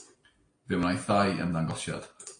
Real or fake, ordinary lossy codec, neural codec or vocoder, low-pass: real; AAC, 48 kbps; none; 9.9 kHz